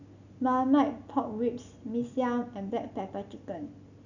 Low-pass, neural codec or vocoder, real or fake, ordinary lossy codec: 7.2 kHz; none; real; none